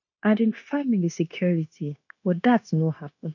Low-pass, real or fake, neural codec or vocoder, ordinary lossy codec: 7.2 kHz; fake; codec, 16 kHz, 0.9 kbps, LongCat-Audio-Codec; none